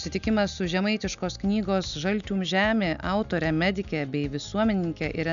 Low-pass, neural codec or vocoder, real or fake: 7.2 kHz; none; real